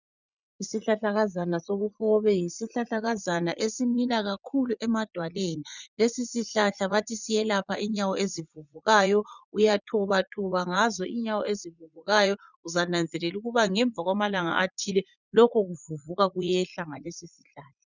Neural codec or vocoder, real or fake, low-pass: vocoder, 44.1 kHz, 80 mel bands, Vocos; fake; 7.2 kHz